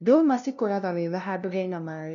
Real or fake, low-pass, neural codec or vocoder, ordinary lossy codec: fake; 7.2 kHz; codec, 16 kHz, 0.5 kbps, FunCodec, trained on LibriTTS, 25 frames a second; none